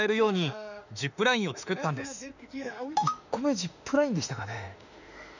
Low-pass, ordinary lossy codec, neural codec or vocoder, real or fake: 7.2 kHz; none; autoencoder, 48 kHz, 32 numbers a frame, DAC-VAE, trained on Japanese speech; fake